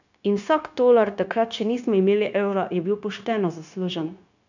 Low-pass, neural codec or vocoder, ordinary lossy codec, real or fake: 7.2 kHz; codec, 16 kHz, 0.9 kbps, LongCat-Audio-Codec; none; fake